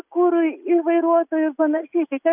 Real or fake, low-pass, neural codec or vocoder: real; 3.6 kHz; none